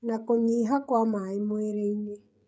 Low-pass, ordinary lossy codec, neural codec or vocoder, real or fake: none; none; codec, 16 kHz, 16 kbps, FreqCodec, smaller model; fake